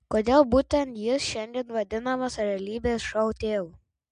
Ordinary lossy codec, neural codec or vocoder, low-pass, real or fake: MP3, 64 kbps; none; 9.9 kHz; real